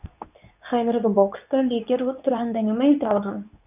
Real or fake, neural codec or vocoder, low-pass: fake; codec, 24 kHz, 0.9 kbps, WavTokenizer, medium speech release version 2; 3.6 kHz